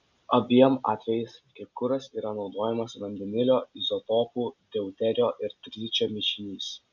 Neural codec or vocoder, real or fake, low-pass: none; real; 7.2 kHz